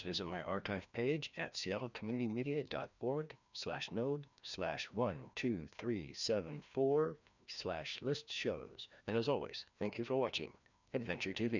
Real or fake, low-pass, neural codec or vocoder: fake; 7.2 kHz; codec, 16 kHz, 1 kbps, FreqCodec, larger model